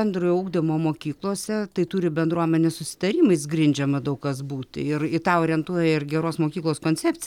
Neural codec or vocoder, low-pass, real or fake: none; 19.8 kHz; real